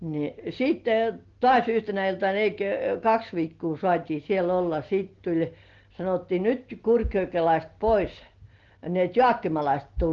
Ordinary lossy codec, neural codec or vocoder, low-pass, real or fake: Opus, 16 kbps; none; 7.2 kHz; real